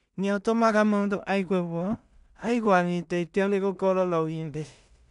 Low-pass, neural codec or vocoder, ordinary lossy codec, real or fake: 10.8 kHz; codec, 16 kHz in and 24 kHz out, 0.4 kbps, LongCat-Audio-Codec, two codebook decoder; none; fake